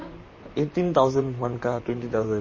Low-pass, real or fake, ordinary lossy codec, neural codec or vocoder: 7.2 kHz; fake; MP3, 32 kbps; vocoder, 44.1 kHz, 128 mel bands, Pupu-Vocoder